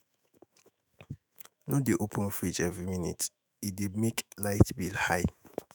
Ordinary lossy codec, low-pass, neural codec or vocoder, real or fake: none; none; autoencoder, 48 kHz, 128 numbers a frame, DAC-VAE, trained on Japanese speech; fake